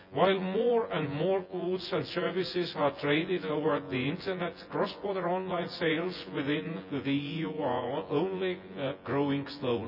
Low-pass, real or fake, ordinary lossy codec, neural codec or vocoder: 5.4 kHz; fake; none; vocoder, 24 kHz, 100 mel bands, Vocos